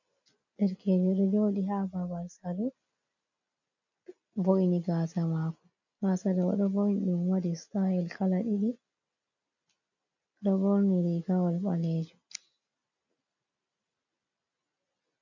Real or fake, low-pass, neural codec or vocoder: real; 7.2 kHz; none